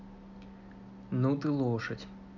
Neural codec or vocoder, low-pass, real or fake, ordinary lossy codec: none; 7.2 kHz; real; none